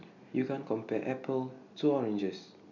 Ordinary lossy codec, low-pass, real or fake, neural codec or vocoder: none; 7.2 kHz; real; none